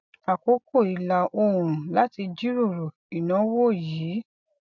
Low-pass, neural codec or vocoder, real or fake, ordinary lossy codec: 7.2 kHz; none; real; none